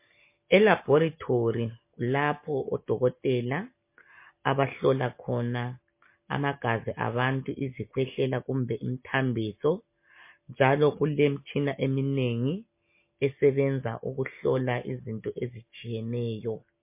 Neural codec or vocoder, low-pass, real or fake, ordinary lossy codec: none; 3.6 kHz; real; MP3, 24 kbps